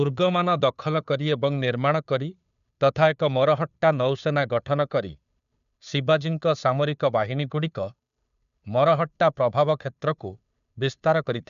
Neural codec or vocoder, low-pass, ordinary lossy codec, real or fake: codec, 16 kHz, 2 kbps, FunCodec, trained on Chinese and English, 25 frames a second; 7.2 kHz; none; fake